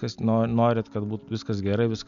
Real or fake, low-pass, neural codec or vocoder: real; 7.2 kHz; none